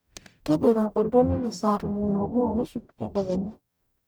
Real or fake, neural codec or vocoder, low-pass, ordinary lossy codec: fake; codec, 44.1 kHz, 0.9 kbps, DAC; none; none